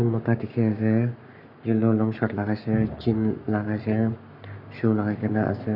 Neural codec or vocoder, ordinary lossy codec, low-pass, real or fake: codec, 44.1 kHz, 7.8 kbps, Pupu-Codec; none; 5.4 kHz; fake